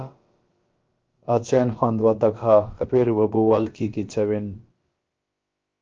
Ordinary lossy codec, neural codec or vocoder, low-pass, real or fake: Opus, 24 kbps; codec, 16 kHz, about 1 kbps, DyCAST, with the encoder's durations; 7.2 kHz; fake